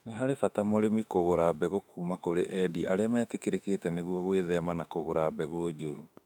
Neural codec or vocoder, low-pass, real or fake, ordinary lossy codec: autoencoder, 48 kHz, 32 numbers a frame, DAC-VAE, trained on Japanese speech; 19.8 kHz; fake; none